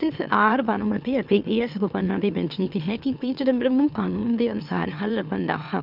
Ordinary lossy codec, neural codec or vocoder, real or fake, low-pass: none; autoencoder, 44.1 kHz, a latent of 192 numbers a frame, MeloTTS; fake; 5.4 kHz